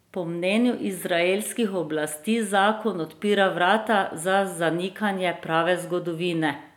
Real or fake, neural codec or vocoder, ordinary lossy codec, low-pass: real; none; none; 19.8 kHz